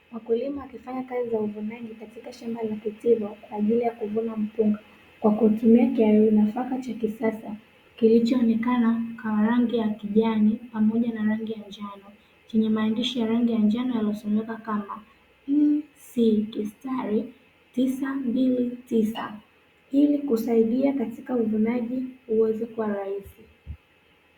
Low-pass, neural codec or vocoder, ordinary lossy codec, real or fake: 19.8 kHz; none; Opus, 64 kbps; real